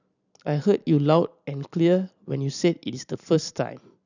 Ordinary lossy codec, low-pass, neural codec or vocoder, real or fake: none; 7.2 kHz; vocoder, 44.1 kHz, 128 mel bands every 256 samples, BigVGAN v2; fake